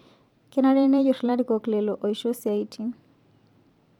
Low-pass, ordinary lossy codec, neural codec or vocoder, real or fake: 19.8 kHz; none; vocoder, 44.1 kHz, 128 mel bands every 512 samples, BigVGAN v2; fake